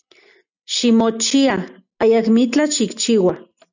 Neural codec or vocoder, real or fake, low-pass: none; real; 7.2 kHz